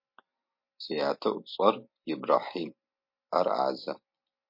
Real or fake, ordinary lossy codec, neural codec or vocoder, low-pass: real; MP3, 32 kbps; none; 5.4 kHz